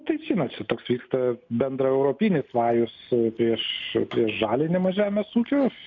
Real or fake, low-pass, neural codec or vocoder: real; 7.2 kHz; none